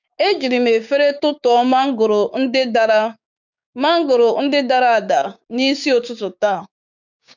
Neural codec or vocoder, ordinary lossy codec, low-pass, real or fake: codec, 16 kHz, 6 kbps, DAC; none; 7.2 kHz; fake